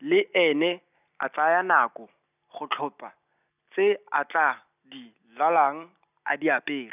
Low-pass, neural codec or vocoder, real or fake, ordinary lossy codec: 3.6 kHz; none; real; none